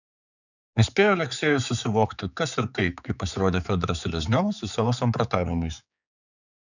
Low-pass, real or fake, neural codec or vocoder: 7.2 kHz; fake; codec, 16 kHz, 4 kbps, X-Codec, HuBERT features, trained on general audio